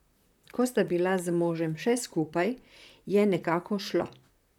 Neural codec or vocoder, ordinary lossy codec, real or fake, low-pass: vocoder, 44.1 kHz, 128 mel bands, Pupu-Vocoder; none; fake; 19.8 kHz